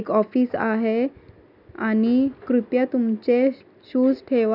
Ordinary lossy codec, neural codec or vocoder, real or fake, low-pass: none; none; real; 5.4 kHz